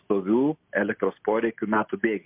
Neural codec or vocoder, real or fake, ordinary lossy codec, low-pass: none; real; MP3, 32 kbps; 3.6 kHz